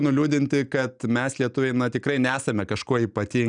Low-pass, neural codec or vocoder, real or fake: 9.9 kHz; none; real